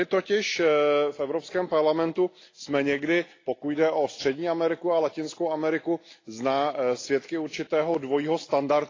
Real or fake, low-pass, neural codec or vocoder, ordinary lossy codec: real; 7.2 kHz; none; AAC, 32 kbps